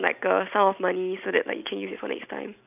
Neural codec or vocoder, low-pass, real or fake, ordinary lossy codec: none; 3.6 kHz; real; none